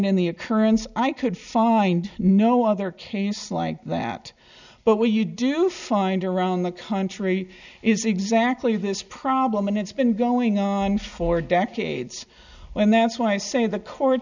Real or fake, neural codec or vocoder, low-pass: real; none; 7.2 kHz